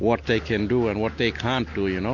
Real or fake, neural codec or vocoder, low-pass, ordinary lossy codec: real; none; 7.2 kHz; MP3, 48 kbps